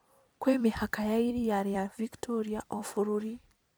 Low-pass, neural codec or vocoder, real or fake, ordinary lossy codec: none; vocoder, 44.1 kHz, 128 mel bands every 256 samples, BigVGAN v2; fake; none